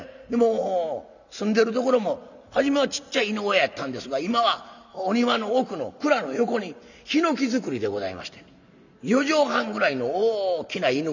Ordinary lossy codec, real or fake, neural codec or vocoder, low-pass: none; real; none; 7.2 kHz